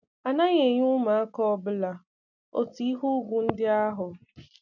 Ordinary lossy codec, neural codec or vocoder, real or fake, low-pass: none; none; real; 7.2 kHz